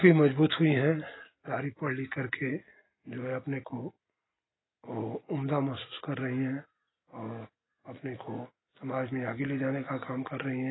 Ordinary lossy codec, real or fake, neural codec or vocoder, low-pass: AAC, 16 kbps; real; none; 7.2 kHz